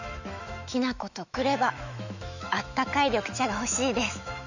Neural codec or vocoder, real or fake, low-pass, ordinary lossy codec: autoencoder, 48 kHz, 128 numbers a frame, DAC-VAE, trained on Japanese speech; fake; 7.2 kHz; none